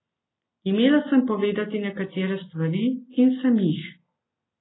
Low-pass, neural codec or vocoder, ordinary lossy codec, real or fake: 7.2 kHz; none; AAC, 16 kbps; real